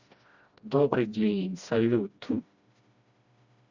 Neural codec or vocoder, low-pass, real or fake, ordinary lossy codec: codec, 16 kHz, 1 kbps, FreqCodec, smaller model; 7.2 kHz; fake; Opus, 64 kbps